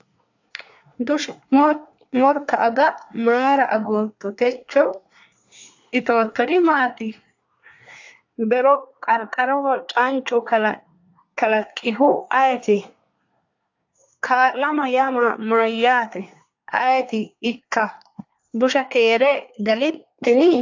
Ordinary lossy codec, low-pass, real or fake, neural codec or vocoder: AAC, 48 kbps; 7.2 kHz; fake; codec, 24 kHz, 1 kbps, SNAC